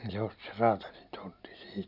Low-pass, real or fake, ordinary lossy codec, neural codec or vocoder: 5.4 kHz; real; none; none